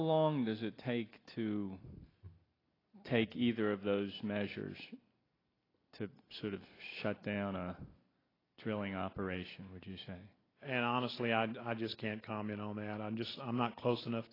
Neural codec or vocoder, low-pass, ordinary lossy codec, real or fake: none; 5.4 kHz; AAC, 24 kbps; real